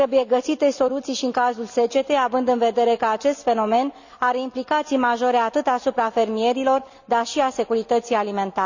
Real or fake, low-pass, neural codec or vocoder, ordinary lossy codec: real; 7.2 kHz; none; none